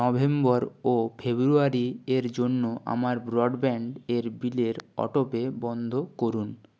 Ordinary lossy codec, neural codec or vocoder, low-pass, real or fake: none; none; none; real